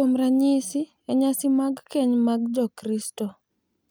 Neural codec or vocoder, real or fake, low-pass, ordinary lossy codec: none; real; none; none